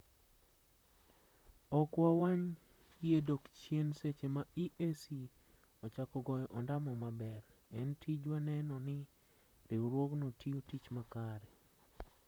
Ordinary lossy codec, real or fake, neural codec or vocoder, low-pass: none; fake; vocoder, 44.1 kHz, 128 mel bands, Pupu-Vocoder; none